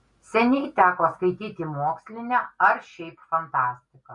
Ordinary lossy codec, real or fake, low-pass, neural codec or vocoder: MP3, 48 kbps; real; 10.8 kHz; none